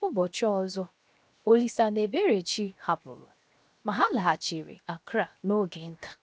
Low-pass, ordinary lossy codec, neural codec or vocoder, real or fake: none; none; codec, 16 kHz, 0.7 kbps, FocalCodec; fake